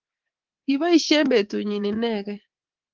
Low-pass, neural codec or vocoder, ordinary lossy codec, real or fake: 7.2 kHz; codec, 24 kHz, 3.1 kbps, DualCodec; Opus, 16 kbps; fake